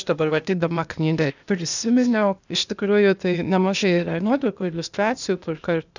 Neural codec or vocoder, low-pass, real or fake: codec, 16 kHz, 0.8 kbps, ZipCodec; 7.2 kHz; fake